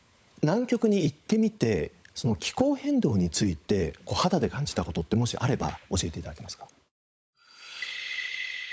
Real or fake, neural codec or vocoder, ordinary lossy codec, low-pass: fake; codec, 16 kHz, 16 kbps, FunCodec, trained on LibriTTS, 50 frames a second; none; none